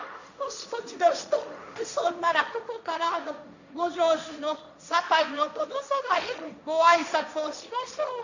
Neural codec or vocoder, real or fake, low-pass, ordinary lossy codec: codec, 16 kHz, 1.1 kbps, Voila-Tokenizer; fake; 7.2 kHz; none